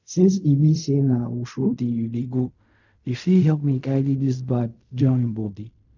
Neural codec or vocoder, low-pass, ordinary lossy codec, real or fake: codec, 16 kHz in and 24 kHz out, 0.4 kbps, LongCat-Audio-Codec, fine tuned four codebook decoder; 7.2 kHz; none; fake